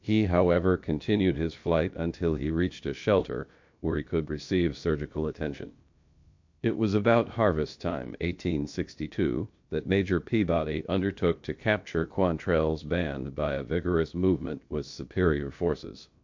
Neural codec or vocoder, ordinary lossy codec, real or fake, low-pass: codec, 16 kHz, about 1 kbps, DyCAST, with the encoder's durations; MP3, 48 kbps; fake; 7.2 kHz